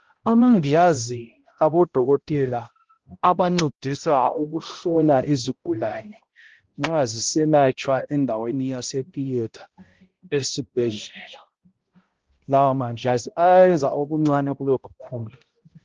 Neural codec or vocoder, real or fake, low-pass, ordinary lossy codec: codec, 16 kHz, 0.5 kbps, X-Codec, HuBERT features, trained on balanced general audio; fake; 7.2 kHz; Opus, 16 kbps